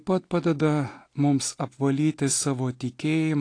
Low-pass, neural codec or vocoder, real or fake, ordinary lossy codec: 9.9 kHz; none; real; AAC, 48 kbps